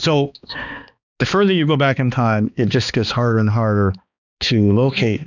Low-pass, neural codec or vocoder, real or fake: 7.2 kHz; codec, 16 kHz, 2 kbps, X-Codec, HuBERT features, trained on balanced general audio; fake